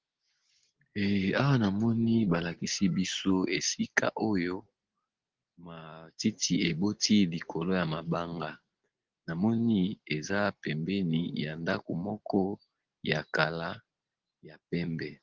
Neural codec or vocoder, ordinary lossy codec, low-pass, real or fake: none; Opus, 16 kbps; 7.2 kHz; real